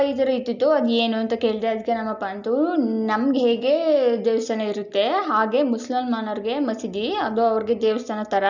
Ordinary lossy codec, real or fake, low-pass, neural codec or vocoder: none; real; 7.2 kHz; none